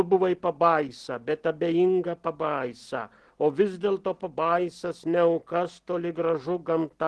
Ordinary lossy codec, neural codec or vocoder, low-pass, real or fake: Opus, 16 kbps; none; 10.8 kHz; real